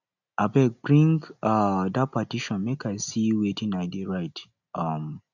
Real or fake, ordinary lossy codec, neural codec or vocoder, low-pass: real; none; none; 7.2 kHz